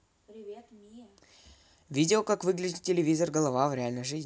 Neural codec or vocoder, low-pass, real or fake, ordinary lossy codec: none; none; real; none